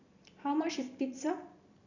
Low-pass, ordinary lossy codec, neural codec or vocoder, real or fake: 7.2 kHz; none; vocoder, 44.1 kHz, 128 mel bands every 256 samples, BigVGAN v2; fake